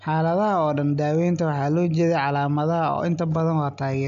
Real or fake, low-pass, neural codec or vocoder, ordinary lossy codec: real; 7.2 kHz; none; none